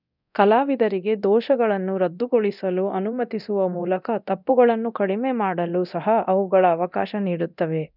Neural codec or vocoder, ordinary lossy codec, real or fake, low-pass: codec, 24 kHz, 0.9 kbps, DualCodec; none; fake; 5.4 kHz